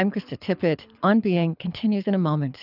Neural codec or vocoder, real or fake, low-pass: codec, 24 kHz, 6 kbps, HILCodec; fake; 5.4 kHz